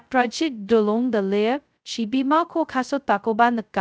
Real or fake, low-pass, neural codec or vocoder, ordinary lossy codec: fake; none; codec, 16 kHz, 0.2 kbps, FocalCodec; none